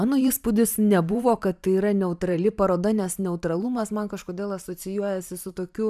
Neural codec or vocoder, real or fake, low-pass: vocoder, 44.1 kHz, 128 mel bands every 512 samples, BigVGAN v2; fake; 14.4 kHz